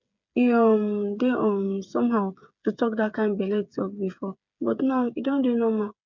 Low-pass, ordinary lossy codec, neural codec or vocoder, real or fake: 7.2 kHz; none; codec, 16 kHz, 8 kbps, FreqCodec, smaller model; fake